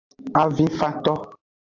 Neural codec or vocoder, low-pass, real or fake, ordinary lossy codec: none; 7.2 kHz; real; AAC, 48 kbps